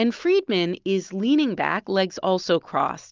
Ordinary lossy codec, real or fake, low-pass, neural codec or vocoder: Opus, 24 kbps; real; 7.2 kHz; none